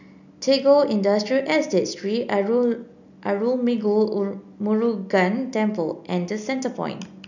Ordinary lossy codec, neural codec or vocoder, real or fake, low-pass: none; none; real; 7.2 kHz